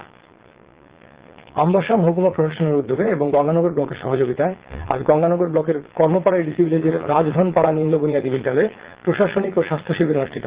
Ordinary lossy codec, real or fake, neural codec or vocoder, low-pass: Opus, 16 kbps; fake; vocoder, 22.05 kHz, 80 mel bands, Vocos; 3.6 kHz